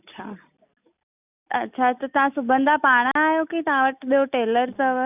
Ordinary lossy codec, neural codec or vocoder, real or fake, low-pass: none; none; real; 3.6 kHz